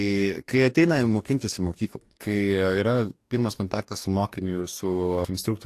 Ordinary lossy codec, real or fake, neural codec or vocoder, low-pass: AAC, 64 kbps; fake; codec, 44.1 kHz, 2.6 kbps, DAC; 14.4 kHz